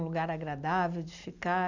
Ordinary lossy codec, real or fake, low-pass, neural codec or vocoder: none; real; 7.2 kHz; none